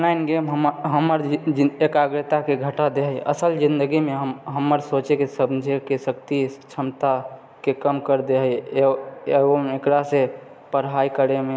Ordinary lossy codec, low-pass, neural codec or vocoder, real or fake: none; none; none; real